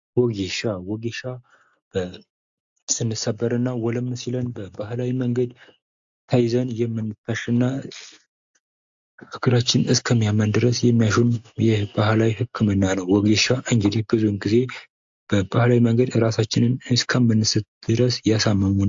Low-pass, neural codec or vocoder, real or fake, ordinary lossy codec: 7.2 kHz; none; real; AAC, 64 kbps